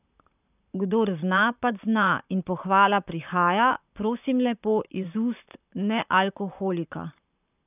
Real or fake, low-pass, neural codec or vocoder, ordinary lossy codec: fake; 3.6 kHz; vocoder, 44.1 kHz, 128 mel bands, Pupu-Vocoder; none